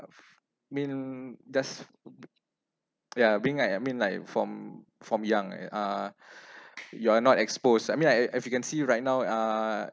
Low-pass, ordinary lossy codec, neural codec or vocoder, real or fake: none; none; none; real